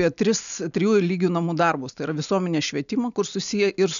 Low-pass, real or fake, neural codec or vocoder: 7.2 kHz; real; none